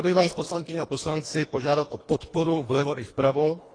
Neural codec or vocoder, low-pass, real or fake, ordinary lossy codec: codec, 24 kHz, 1.5 kbps, HILCodec; 9.9 kHz; fake; AAC, 32 kbps